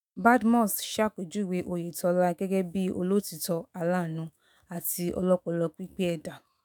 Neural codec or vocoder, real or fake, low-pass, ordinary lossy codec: autoencoder, 48 kHz, 128 numbers a frame, DAC-VAE, trained on Japanese speech; fake; none; none